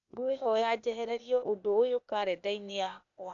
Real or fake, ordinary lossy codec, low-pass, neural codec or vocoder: fake; none; 7.2 kHz; codec, 16 kHz, 0.8 kbps, ZipCodec